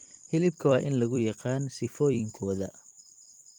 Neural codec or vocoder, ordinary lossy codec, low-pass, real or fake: vocoder, 44.1 kHz, 128 mel bands every 256 samples, BigVGAN v2; Opus, 24 kbps; 19.8 kHz; fake